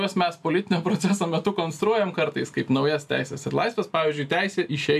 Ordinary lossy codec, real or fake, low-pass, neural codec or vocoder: AAC, 96 kbps; real; 14.4 kHz; none